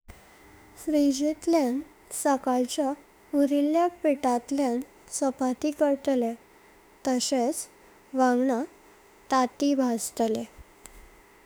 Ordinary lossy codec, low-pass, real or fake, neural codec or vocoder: none; none; fake; autoencoder, 48 kHz, 32 numbers a frame, DAC-VAE, trained on Japanese speech